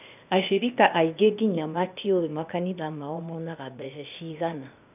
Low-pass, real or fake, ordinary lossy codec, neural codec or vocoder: 3.6 kHz; fake; none; codec, 16 kHz, 0.8 kbps, ZipCodec